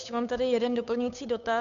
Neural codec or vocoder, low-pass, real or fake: codec, 16 kHz, 8 kbps, FunCodec, trained on Chinese and English, 25 frames a second; 7.2 kHz; fake